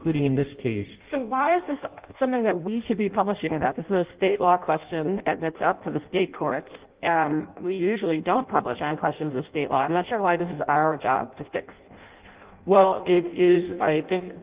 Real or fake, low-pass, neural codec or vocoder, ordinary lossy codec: fake; 3.6 kHz; codec, 16 kHz in and 24 kHz out, 0.6 kbps, FireRedTTS-2 codec; Opus, 32 kbps